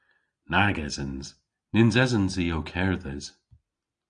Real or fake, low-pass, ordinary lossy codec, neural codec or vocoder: real; 9.9 kHz; AAC, 64 kbps; none